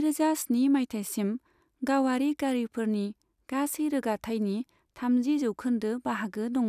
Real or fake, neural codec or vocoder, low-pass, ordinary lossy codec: real; none; 14.4 kHz; none